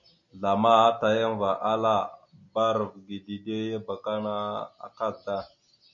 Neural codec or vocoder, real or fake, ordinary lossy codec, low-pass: none; real; MP3, 96 kbps; 7.2 kHz